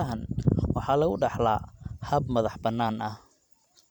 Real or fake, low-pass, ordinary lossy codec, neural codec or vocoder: fake; 19.8 kHz; none; vocoder, 44.1 kHz, 128 mel bands every 512 samples, BigVGAN v2